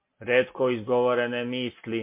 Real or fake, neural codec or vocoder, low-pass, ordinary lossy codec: real; none; 3.6 kHz; MP3, 24 kbps